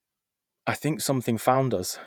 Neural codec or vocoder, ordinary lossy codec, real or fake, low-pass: vocoder, 48 kHz, 128 mel bands, Vocos; none; fake; none